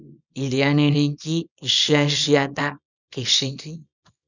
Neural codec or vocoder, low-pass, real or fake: codec, 24 kHz, 0.9 kbps, WavTokenizer, small release; 7.2 kHz; fake